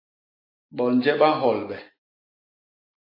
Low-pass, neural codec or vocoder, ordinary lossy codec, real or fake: 5.4 kHz; none; AAC, 24 kbps; real